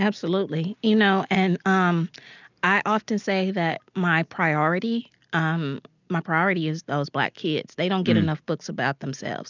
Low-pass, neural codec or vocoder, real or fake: 7.2 kHz; none; real